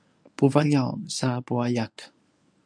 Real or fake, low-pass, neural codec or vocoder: fake; 9.9 kHz; codec, 24 kHz, 0.9 kbps, WavTokenizer, medium speech release version 1